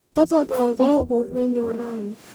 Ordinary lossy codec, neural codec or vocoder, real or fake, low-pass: none; codec, 44.1 kHz, 0.9 kbps, DAC; fake; none